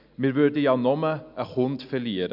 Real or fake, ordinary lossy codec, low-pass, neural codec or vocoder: real; none; 5.4 kHz; none